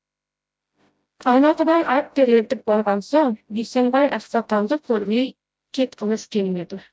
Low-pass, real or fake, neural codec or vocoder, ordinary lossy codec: none; fake; codec, 16 kHz, 0.5 kbps, FreqCodec, smaller model; none